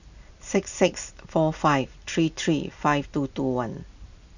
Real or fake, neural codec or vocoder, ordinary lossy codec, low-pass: real; none; none; 7.2 kHz